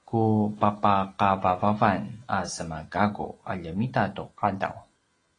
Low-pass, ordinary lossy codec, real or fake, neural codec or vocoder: 9.9 kHz; AAC, 32 kbps; real; none